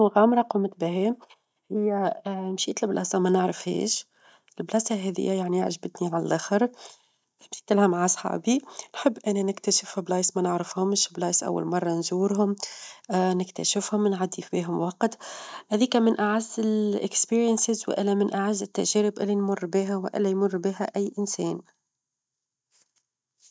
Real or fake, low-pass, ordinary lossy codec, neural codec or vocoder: real; none; none; none